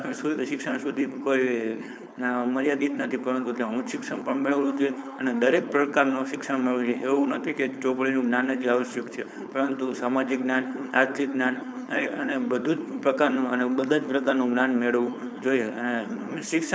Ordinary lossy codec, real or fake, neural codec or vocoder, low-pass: none; fake; codec, 16 kHz, 4.8 kbps, FACodec; none